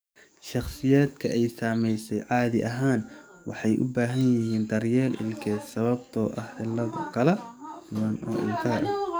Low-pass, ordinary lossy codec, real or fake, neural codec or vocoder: none; none; fake; codec, 44.1 kHz, 7.8 kbps, DAC